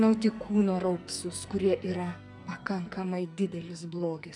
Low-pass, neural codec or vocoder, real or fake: 10.8 kHz; codec, 44.1 kHz, 2.6 kbps, SNAC; fake